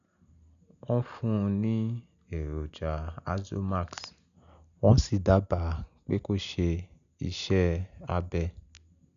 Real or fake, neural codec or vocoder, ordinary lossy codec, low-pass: real; none; none; 7.2 kHz